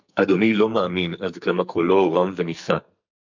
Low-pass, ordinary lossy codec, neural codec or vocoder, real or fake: 7.2 kHz; MP3, 64 kbps; codec, 44.1 kHz, 2.6 kbps, SNAC; fake